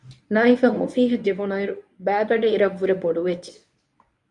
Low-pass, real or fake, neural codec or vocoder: 10.8 kHz; fake; codec, 24 kHz, 0.9 kbps, WavTokenizer, medium speech release version 1